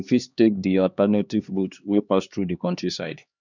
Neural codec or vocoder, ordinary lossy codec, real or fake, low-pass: codec, 16 kHz, 2 kbps, X-Codec, HuBERT features, trained on LibriSpeech; none; fake; 7.2 kHz